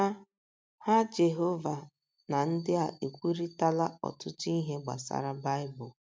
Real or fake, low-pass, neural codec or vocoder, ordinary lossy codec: real; none; none; none